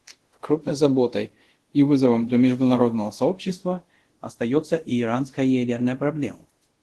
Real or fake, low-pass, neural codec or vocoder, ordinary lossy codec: fake; 10.8 kHz; codec, 24 kHz, 0.5 kbps, DualCodec; Opus, 16 kbps